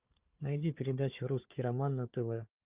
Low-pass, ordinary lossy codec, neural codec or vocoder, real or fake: 3.6 kHz; Opus, 24 kbps; codec, 16 kHz, 4 kbps, FunCodec, trained on Chinese and English, 50 frames a second; fake